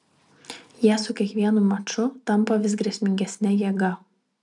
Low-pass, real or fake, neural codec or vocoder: 10.8 kHz; real; none